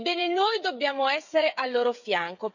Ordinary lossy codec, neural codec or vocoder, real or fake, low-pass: none; codec, 16 kHz, 8 kbps, FreqCodec, smaller model; fake; 7.2 kHz